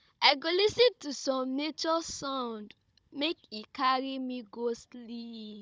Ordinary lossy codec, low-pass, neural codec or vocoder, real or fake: none; none; codec, 16 kHz, 16 kbps, FunCodec, trained on Chinese and English, 50 frames a second; fake